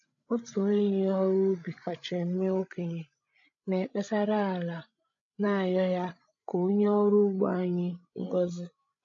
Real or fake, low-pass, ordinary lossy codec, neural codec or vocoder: fake; 7.2 kHz; AAC, 48 kbps; codec, 16 kHz, 16 kbps, FreqCodec, larger model